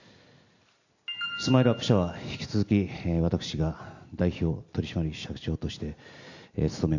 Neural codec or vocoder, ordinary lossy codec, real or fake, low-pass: none; none; real; 7.2 kHz